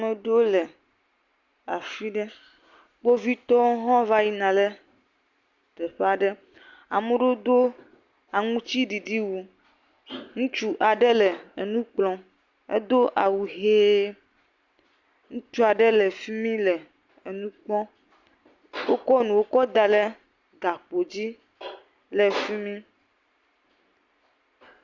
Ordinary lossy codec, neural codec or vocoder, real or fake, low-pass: Opus, 64 kbps; none; real; 7.2 kHz